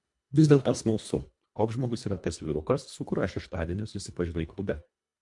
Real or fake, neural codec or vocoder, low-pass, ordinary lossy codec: fake; codec, 24 kHz, 1.5 kbps, HILCodec; 10.8 kHz; MP3, 64 kbps